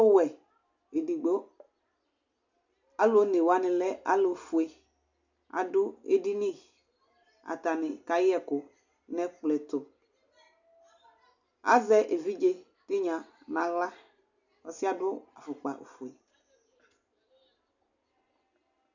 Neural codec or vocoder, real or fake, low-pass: none; real; 7.2 kHz